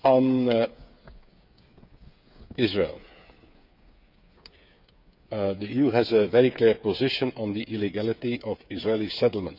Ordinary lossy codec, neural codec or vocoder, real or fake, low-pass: none; codec, 16 kHz, 8 kbps, FreqCodec, smaller model; fake; 5.4 kHz